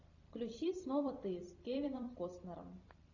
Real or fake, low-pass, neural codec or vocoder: fake; 7.2 kHz; vocoder, 44.1 kHz, 128 mel bands every 256 samples, BigVGAN v2